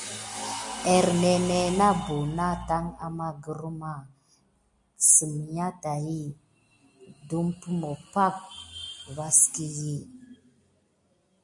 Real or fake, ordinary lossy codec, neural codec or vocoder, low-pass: real; MP3, 48 kbps; none; 10.8 kHz